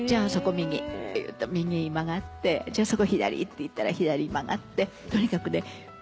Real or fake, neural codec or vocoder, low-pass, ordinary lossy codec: real; none; none; none